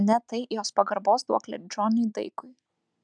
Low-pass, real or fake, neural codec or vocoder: 9.9 kHz; real; none